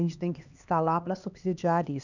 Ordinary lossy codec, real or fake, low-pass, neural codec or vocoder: none; fake; 7.2 kHz; codec, 16 kHz, 2 kbps, X-Codec, HuBERT features, trained on LibriSpeech